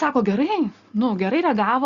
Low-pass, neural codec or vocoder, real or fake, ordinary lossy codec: 7.2 kHz; none; real; Opus, 64 kbps